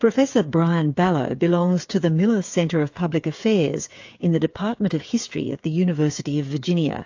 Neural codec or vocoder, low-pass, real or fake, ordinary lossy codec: codec, 16 kHz, 8 kbps, FreqCodec, smaller model; 7.2 kHz; fake; AAC, 48 kbps